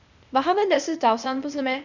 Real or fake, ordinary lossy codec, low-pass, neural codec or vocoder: fake; none; 7.2 kHz; codec, 16 kHz, 0.8 kbps, ZipCodec